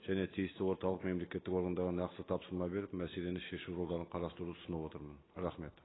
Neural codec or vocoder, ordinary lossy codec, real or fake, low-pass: none; AAC, 16 kbps; real; 7.2 kHz